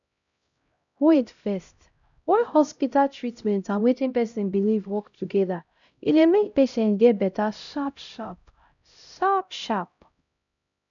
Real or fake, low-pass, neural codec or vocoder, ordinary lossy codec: fake; 7.2 kHz; codec, 16 kHz, 0.5 kbps, X-Codec, HuBERT features, trained on LibriSpeech; none